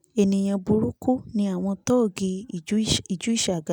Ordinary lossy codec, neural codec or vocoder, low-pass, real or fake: none; none; none; real